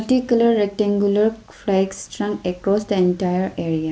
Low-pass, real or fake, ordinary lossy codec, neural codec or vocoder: none; real; none; none